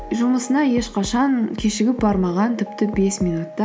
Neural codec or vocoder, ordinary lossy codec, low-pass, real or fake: none; none; none; real